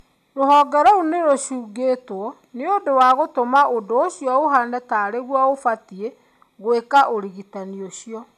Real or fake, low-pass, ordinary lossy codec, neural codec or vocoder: real; 14.4 kHz; none; none